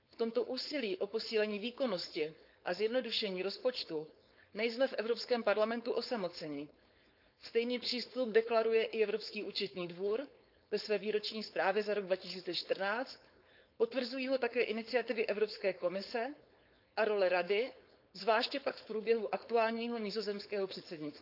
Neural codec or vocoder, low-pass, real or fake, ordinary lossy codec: codec, 16 kHz, 4.8 kbps, FACodec; 5.4 kHz; fake; none